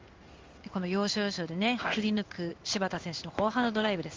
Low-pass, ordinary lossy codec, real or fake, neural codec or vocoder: 7.2 kHz; Opus, 32 kbps; fake; codec, 16 kHz in and 24 kHz out, 1 kbps, XY-Tokenizer